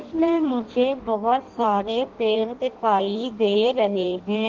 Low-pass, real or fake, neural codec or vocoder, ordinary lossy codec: 7.2 kHz; fake; codec, 16 kHz in and 24 kHz out, 0.6 kbps, FireRedTTS-2 codec; Opus, 16 kbps